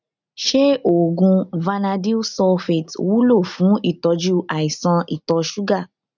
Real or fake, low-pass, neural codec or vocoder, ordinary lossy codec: real; 7.2 kHz; none; none